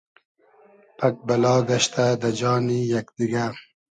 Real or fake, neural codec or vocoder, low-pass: real; none; 9.9 kHz